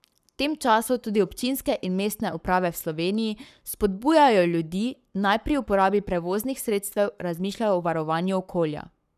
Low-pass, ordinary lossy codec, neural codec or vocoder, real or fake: 14.4 kHz; none; codec, 44.1 kHz, 7.8 kbps, Pupu-Codec; fake